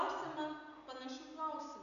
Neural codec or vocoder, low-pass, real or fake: none; 7.2 kHz; real